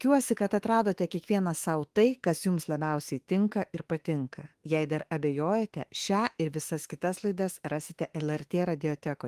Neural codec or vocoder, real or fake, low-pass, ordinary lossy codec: autoencoder, 48 kHz, 32 numbers a frame, DAC-VAE, trained on Japanese speech; fake; 14.4 kHz; Opus, 24 kbps